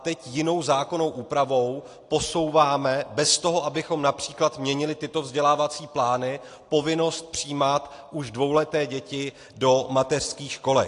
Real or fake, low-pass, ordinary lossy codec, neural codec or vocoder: real; 10.8 kHz; AAC, 48 kbps; none